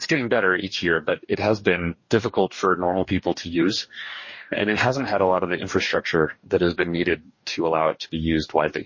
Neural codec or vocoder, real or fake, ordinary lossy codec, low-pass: codec, 44.1 kHz, 2.6 kbps, DAC; fake; MP3, 32 kbps; 7.2 kHz